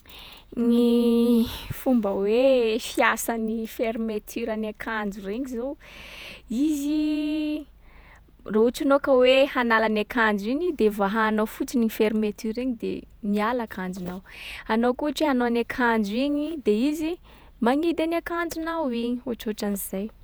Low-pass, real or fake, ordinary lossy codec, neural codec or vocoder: none; fake; none; vocoder, 48 kHz, 128 mel bands, Vocos